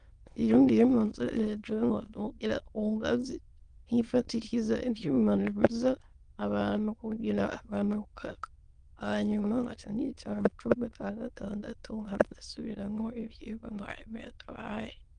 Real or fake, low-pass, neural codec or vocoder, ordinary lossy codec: fake; 9.9 kHz; autoencoder, 22.05 kHz, a latent of 192 numbers a frame, VITS, trained on many speakers; Opus, 24 kbps